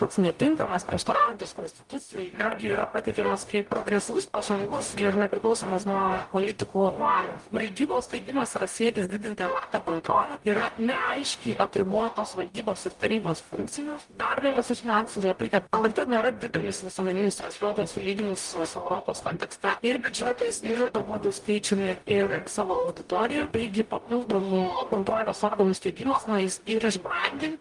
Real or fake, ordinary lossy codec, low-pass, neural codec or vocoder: fake; Opus, 32 kbps; 10.8 kHz; codec, 44.1 kHz, 0.9 kbps, DAC